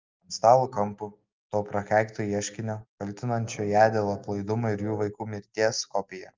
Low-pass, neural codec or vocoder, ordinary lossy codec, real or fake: 7.2 kHz; none; Opus, 32 kbps; real